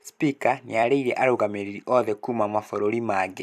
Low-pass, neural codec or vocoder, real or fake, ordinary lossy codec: 14.4 kHz; vocoder, 44.1 kHz, 128 mel bands every 256 samples, BigVGAN v2; fake; none